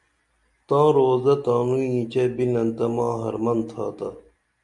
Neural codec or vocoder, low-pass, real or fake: none; 10.8 kHz; real